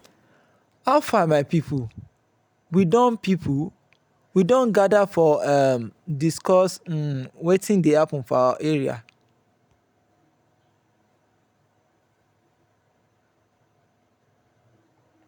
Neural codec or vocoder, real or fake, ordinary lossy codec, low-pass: none; real; none; none